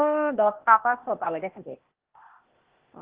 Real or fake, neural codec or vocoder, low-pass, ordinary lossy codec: fake; codec, 16 kHz, 0.8 kbps, ZipCodec; 3.6 kHz; Opus, 16 kbps